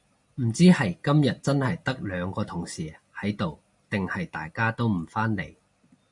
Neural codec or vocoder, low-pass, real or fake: none; 10.8 kHz; real